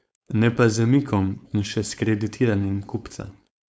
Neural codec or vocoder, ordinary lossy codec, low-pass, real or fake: codec, 16 kHz, 4.8 kbps, FACodec; none; none; fake